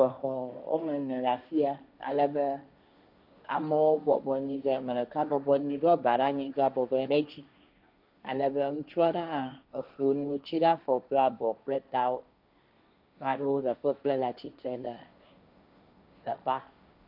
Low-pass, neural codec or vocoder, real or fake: 5.4 kHz; codec, 16 kHz, 1.1 kbps, Voila-Tokenizer; fake